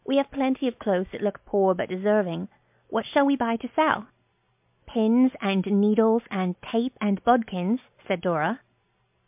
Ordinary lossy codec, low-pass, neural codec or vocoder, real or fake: MP3, 32 kbps; 3.6 kHz; none; real